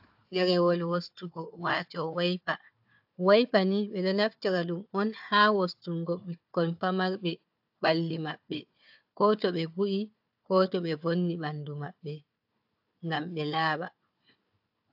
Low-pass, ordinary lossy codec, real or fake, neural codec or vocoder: 5.4 kHz; AAC, 48 kbps; fake; codec, 16 kHz, 4 kbps, FunCodec, trained on Chinese and English, 50 frames a second